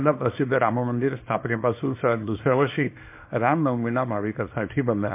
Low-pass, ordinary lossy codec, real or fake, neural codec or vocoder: 3.6 kHz; MP3, 24 kbps; fake; codec, 24 kHz, 0.9 kbps, WavTokenizer, small release